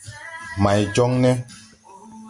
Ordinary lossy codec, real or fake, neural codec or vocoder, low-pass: Opus, 64 kbps; real; none; 10.8 kHz